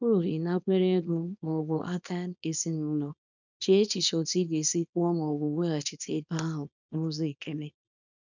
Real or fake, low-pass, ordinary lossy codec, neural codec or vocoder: fake; 7.2 kHz; none; codec, 24 kHz, 0.9 kbps, WavTokenizer, small release